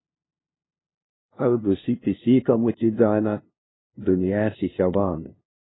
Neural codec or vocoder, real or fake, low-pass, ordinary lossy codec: codec, 16 kHz, 0.5 kbps, FunCodec, trained on LibriTTS, 25 frames a second; fake; 7.2 kHz; AAC, 16 kbps